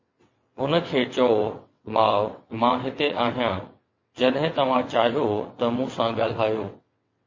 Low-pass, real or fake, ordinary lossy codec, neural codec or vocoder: 7.2 kHz; fake; MP3, 32 kbps; vocoder, 22.05 kHz, 80 mel bands, WaveNeXt